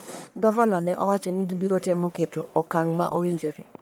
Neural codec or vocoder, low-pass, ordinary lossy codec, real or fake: codec, 44.1 kHz, 1.7 kbps, Pupu-Codec; none; none; fake